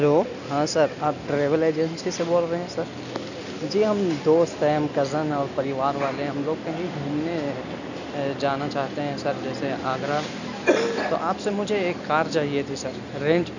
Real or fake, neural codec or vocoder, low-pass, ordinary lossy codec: real; none; 7.2 kHz; none